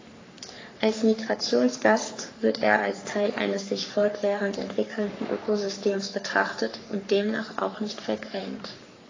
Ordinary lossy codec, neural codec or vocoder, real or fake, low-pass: AAC, 32 kbps; codec, 44.1 kHz, 3.4 kbps, Pupu-Codec; fake; 7.2 kHz